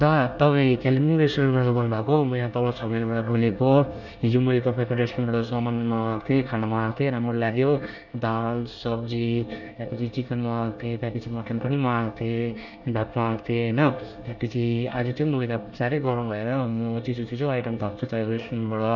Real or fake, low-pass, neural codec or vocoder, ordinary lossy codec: fake; 7.2 kHz; codec, 24 kHz, 1 kbps, SNAC; none